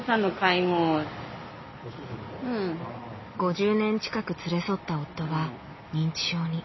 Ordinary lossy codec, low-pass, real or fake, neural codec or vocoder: MP3, 24 kbps; 7.2 kHz; real; none